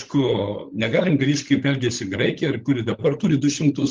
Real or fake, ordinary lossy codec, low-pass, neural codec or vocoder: fake; Opus, 32 kbps; 7.2 kHz; codec, 16 kHz, 16 kbps, FunCodec, trained on LibriTTS, 50 frames a second